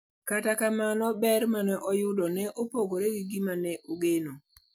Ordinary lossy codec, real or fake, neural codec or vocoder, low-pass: none; real; none; none